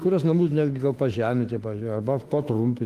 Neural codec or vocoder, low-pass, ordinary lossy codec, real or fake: autoencoder, 48 kHz, 32 numbers a frame, DAC-VAE, trained on Japanese speech; 14.4 kHz; Opus, 24 kbps; fake